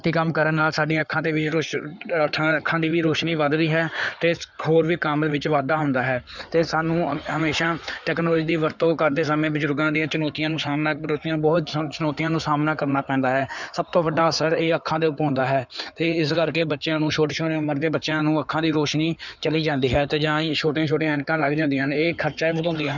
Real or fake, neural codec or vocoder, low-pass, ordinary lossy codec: fake; codec, 16 kHz in and 24 kHz out, 2.2 kbps, FireRedTTS-2 codec; 7.2 kHz; none